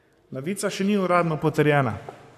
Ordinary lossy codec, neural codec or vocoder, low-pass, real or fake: none; codec, 44.1 kHz, 7.8 kbps, Pupu-Codec; 14.4 kHz; fake